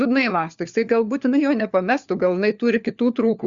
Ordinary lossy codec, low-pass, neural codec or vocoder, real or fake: Opus, 64 kbps; 7.2 kHz; codec, 16 kHz, 4 kbps, FunCodec, trained on LibriTTS, 50 frames a second; fake